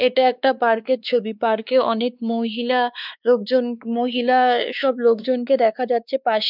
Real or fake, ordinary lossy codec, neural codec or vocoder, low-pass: fake; none; codec, 16 kHz, 2 kbps, X-Codec, WavLM features, trained on Multilingual LibriSpeech; 5.4 kHz